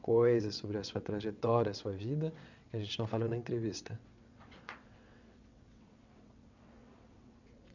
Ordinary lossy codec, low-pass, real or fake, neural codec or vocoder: none; 7.2 kHz; fake; vocoder, 22.05 kHz, 80 mel bands, WaveNeXt